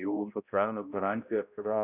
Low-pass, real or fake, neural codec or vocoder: 3.6 kHz; fake; codec, 16 kHz, 0.5 kbps, X-Codec, HuBERT features, trained on balanced general audio